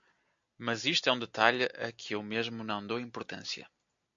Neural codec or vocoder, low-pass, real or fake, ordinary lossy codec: none; 7.2 kHz; real; MP3, 48 kbps